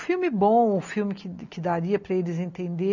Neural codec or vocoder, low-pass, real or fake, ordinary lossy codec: none; 7.2 kHz; real; none